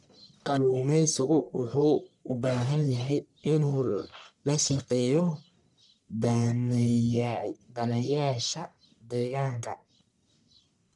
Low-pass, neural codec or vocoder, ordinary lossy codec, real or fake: 10.8 kHz; codec, 44.1 kHz, 1.7 kbps, Pupu-Codec; none; fake